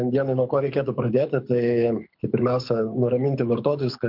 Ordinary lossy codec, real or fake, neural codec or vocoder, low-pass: MP3, 48 kbps; fake; codec, 24 kHz, 6 kbps, HILCodec; 5.4 kHz